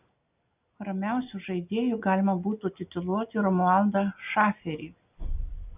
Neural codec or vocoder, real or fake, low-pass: none; real; 3.6 kHz